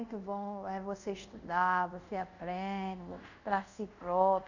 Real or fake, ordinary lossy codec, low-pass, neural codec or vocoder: fake; none; 7.2 kHz; codec, 24 kHz, 0.5 kbps, DualCodec